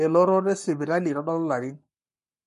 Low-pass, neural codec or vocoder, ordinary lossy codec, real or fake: 14.4 kHz; codec, 44.1 kHz, 7.8 kbps, Pupu-Codec; MP3, 48 kbps; fake